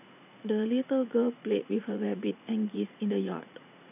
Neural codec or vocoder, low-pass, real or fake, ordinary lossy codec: vocoder, 44.1 kHz, 80 mel bands, Vocos; 3.6 kHz; fake; none